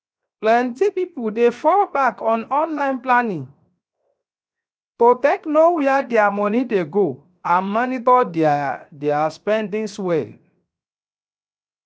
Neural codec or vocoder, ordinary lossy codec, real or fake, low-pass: codec, 16 kHz, 0.7 kbps, FocalCodec; none; fake; none